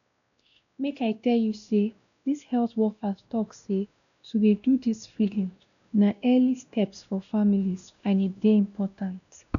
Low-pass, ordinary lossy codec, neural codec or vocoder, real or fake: 7.2 kHz; none; codec, 16 kHz, 1 kbps, X-Codec, WavLM features, trained on Multilingual LibriSpeech; fake